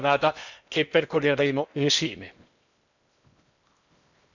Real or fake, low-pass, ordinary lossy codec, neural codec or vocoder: fake; 7.2 kHz; none; codec, 16 kHz in and 24 kHz out, 0.8 kbps, FocalCodec, streaming, 65536 codes